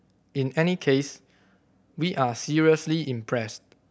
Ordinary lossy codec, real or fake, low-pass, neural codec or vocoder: none; real; none; none